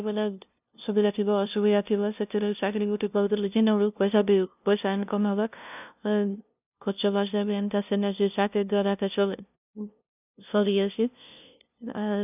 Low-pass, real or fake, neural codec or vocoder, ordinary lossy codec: 3.6 kHz; fake; codec, 16 kHz, 0.5 kbps, FunCodec, trained on LibriTTS, 25 frames a second; none